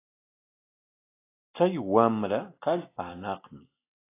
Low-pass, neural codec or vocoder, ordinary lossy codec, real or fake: 3.6 kHz; none; AAC, 24 kbps; real